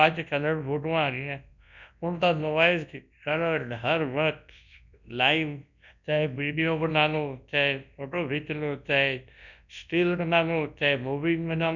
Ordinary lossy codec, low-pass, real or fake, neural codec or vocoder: none; 7.2 kHz; fake; codec, 24 kHz, 0.9 kbps, WavTokenizer, large speech release